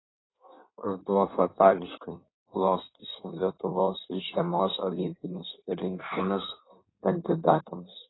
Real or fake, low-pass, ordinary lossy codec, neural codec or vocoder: fake; 7.2 kHz; AAC, 16 kbps; codec, 16 kHz in and 24 kHz out, 1.1 kbps, FireRedTTS-2 codec